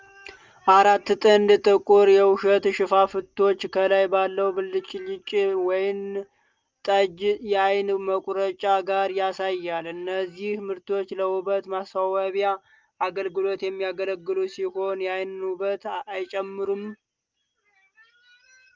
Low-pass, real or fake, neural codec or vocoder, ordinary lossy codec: 7.2 kHz; real; none; Opus, 32 kbps